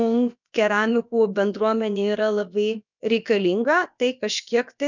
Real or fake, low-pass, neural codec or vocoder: fake; 7.2 kHz; codec, 16 kHz, about 1 kbps, DyCAST, with the encoder's durations